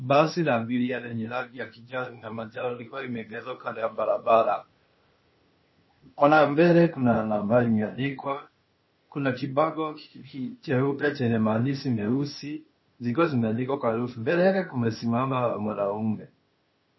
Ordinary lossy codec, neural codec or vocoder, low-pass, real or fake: MP3, 24 kbps; codec, 16 kHz, 0.8 kbps, ZipCodec; 7.2 kHz; fake